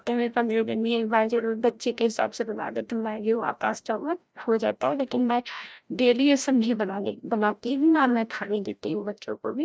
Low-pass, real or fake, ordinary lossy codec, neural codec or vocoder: none; fake; none; codec, 16 kHz, 0.5 kbps, FreqCodec, larger model